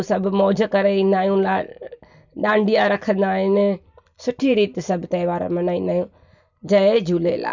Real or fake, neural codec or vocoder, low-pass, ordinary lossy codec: real; none; 7.2 kHz; none